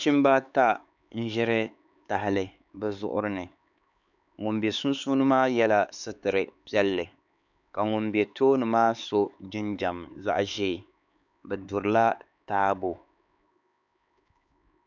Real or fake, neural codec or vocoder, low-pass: fake; codec, 16 kHz, 4 kbps, X-Codec, HuBERT features, trained on LibriSpeech; 7.2 kHz